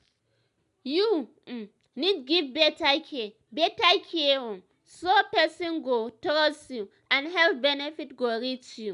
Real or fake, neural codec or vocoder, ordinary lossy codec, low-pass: real; none; none; 10.8 kHz